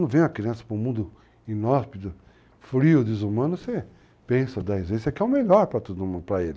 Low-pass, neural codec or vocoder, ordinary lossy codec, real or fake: none; none; none; real